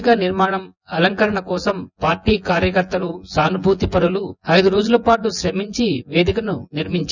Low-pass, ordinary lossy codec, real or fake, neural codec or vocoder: 7.2 kHz; none; fake; vocoder, 24 kHz, 100 mel bands, Vocos